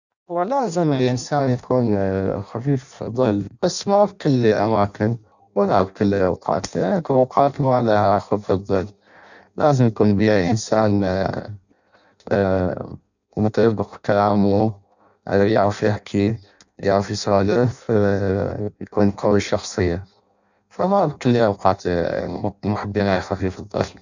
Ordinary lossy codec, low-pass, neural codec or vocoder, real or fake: none; 7.2 kHz; codec, 16 kHz in and 24 kHz out, 0.6 kbps, FireRedTTS-2 codec; fake